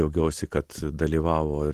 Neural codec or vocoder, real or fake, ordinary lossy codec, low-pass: none; real; Opus, 16 kbps; 14.4 kHz